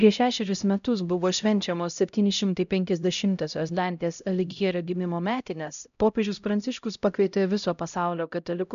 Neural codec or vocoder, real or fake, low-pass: codec, 16 kHz, 0.5 kbps, X-Codec, HuBERT features, trained on LibriSpeech; fake; 7.2 kHz